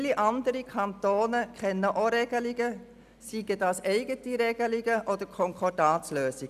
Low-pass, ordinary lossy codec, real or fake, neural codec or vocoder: 14.4 kHz; none; real; none